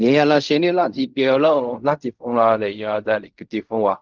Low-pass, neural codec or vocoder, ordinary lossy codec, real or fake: 7.2 kHz; codec, 16 kHz in and 24 kHz out, 0.4 kbps, LongCat-Audio-Codec, fine tuned four codebook decoder; Opus, 24 kbps; fake